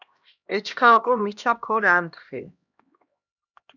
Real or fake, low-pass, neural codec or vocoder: fake; 7.2 kHz; codec, 16 kHz, 1 kbps, X-Codec, HuBERT features, trained on LibriSpeech